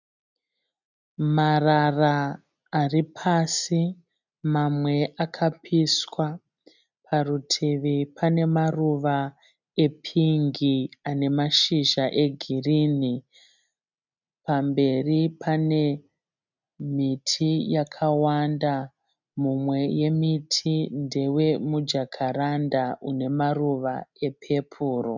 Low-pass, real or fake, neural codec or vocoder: 7.2 kHz; real; none